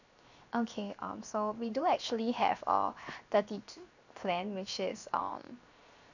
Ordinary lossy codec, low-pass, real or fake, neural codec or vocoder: none; 7.2 kHz; fake; codec, 16 kHz, 0.7 kbps, FocalCodec